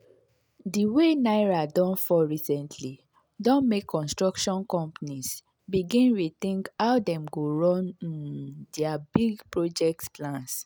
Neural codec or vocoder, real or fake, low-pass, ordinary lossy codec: none; real; none; none